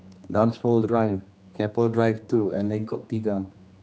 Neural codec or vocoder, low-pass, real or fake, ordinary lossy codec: codec, 16 kHz, 2 kbps, X-Codec, HuBERT features, trained on general audio; none; fake; none